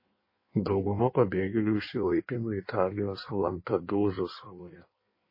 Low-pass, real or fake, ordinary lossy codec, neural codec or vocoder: 5.4 kHz; fake; MP3, 24 kbps; codec, 16 kHz in and 24 kHz out, 1.1 kbps, FireRedTTS-2 codec